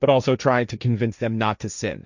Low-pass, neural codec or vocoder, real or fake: 7.2 kHz; codec, 16 kHz, 1.1 kbps, Voila-Tokenizer; fake